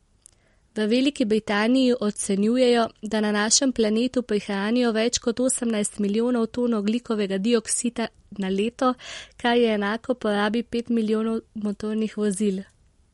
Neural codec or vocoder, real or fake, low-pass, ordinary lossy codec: none; real; 19.8 kHz; MP3, 48 kbps